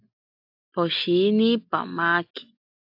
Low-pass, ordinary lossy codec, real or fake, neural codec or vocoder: 5.4 kHz; AAC, 48 kbps; real; none